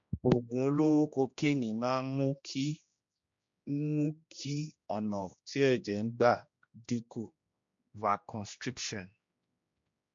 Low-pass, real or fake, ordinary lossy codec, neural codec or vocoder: 7.2 kHz; fake; MP3, 48 kbps; codec, 16 kHz, 1 kbps, X-Codec, HuBERT features, trained on general audio